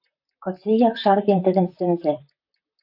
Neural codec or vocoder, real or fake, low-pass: vocoder, 44.1 kHz, 128 mel bands, Pupu-Vocoder; fake; 5.4 kHz